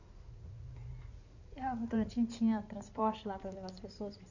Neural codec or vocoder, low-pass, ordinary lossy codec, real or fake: codec, 16 kHz in and 24 kHz out, 2.2 kbps, FireRedTTS-2 codec; 7.2 kHz; none; fake